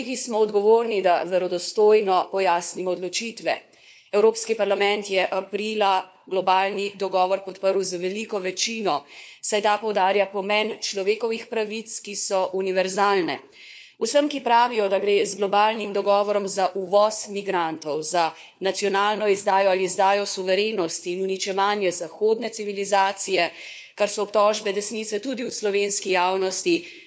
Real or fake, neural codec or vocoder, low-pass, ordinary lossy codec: fake; codec, 16 kHz, 4 kbps, FunCodec, trained on LibriTTS, 50 frames a second; none; none